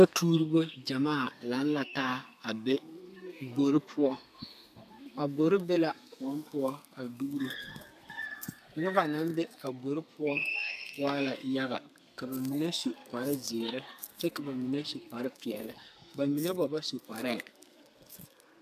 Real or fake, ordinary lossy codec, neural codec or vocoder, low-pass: fake; AAC, 96 kbps; codec, 32 kHz, 1.9 kbps, SNAC; 14.4 kHz